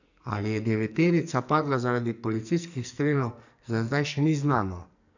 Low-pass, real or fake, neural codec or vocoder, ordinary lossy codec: 7.2 kHz; fake; codec, 44.1 kHz, 2.6 kbps, SNAC; none